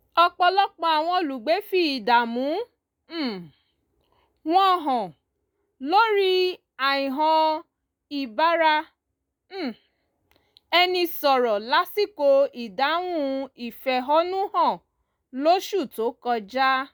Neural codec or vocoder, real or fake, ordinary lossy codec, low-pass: none; real; none; none